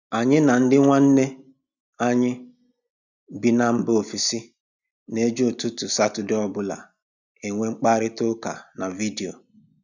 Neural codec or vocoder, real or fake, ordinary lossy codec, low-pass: none; real; none; 7.2 kHz